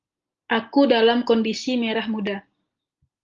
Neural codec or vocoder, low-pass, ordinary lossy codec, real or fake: none; 7.2 kHz; Opus, 32 kbps; real